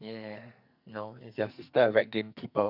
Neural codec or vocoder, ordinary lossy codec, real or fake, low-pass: codec, 44.1 kHz, 2.6 kbps, SNAC; none; fake; 5.4 kHz